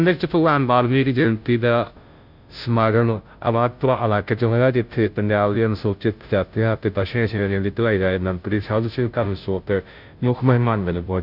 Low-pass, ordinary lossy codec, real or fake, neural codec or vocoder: 5.4 kHz; none; fake; codec, 16 kHz, 0.5 kbps, FunCodec, trained on Chinese and English, 25 frames a second